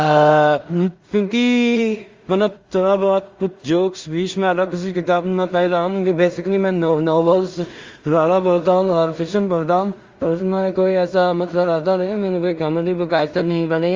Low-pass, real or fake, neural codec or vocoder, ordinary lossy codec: 7.2 kHz; fake; codec, 16 kHz in and 24 kHz out, 0.4 kbps, LongCat-Audio-Codec, two codebook decoder; Opus, 32 kbps